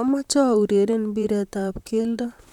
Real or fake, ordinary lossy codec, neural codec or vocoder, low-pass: fake; none; vocoder, 44.1 kHz, 128 mel bands, Pupu-Vocoder; 19.8 kHz